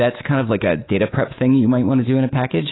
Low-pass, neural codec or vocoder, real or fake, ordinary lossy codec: 7.2 kHz; codec, 24 kHz, 3.1 kbps, DualCodec; fake; AAC, 16 kbps